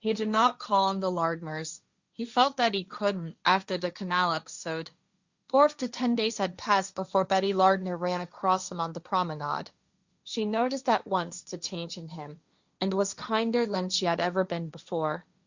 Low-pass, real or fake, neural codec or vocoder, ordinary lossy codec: 7.2 kHz; fake; codec, 16 kHz, 1.1 kbps, Voila-Tokenizer; Opus, 64 kbps